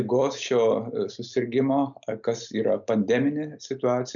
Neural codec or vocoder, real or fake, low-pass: none; real; 7.2 kHz